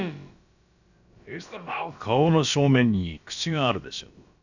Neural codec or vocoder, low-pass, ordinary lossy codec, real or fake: codec, 16 kHz, about 1 kbps, DyCAST, with the encoder's durations; 7.2 kHz; none; fake